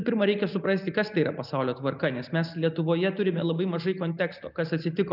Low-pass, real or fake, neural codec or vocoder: 5.4 kHz; real; none